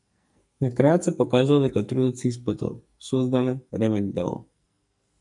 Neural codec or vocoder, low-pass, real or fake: codec, 44.1 kHz, 2.6 kbps, SNAC; 10.8 kHz; fake